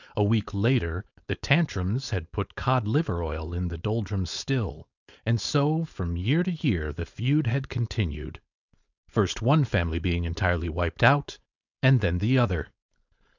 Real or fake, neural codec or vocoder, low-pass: fake; codec, 16 kHz, 4.8 kbps, FACodec; 7.2 kHz